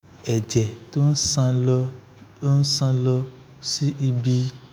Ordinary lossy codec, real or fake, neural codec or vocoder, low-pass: none; fake; autoencoder, 48 kHz, 128 numbers a frame, DAC-VAE, trained on Japanese speech; none